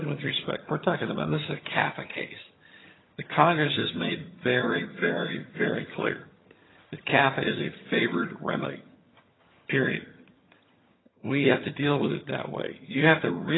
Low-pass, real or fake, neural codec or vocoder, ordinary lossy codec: 7.2 kHz; fake; vocoder, 22.05 kHz, 80 mel bands, HiFi-GAN; AAC, 16 kbps